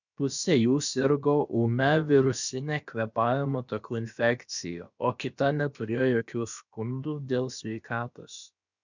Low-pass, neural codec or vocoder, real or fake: 7.2 kHz; codec, 16 kHz, about 1 kbps, DyCAST, with the encoder's durations; fake